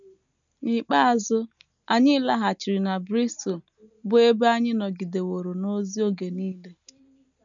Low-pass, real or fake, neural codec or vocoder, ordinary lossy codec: 7.2 kHz; real; none; none